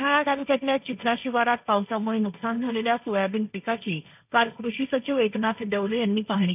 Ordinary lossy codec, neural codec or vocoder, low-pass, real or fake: none; codec, 16 kHz, 1.1 kbps, Voila-Tokenizer; 3.6 kHz; fake